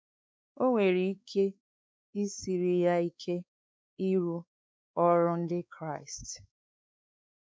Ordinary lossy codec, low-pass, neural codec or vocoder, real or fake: none; none; codec, 16 kHz, 4 kbps, X-Codec, WavLM features, trained on Multilingual LibriSpeech; fake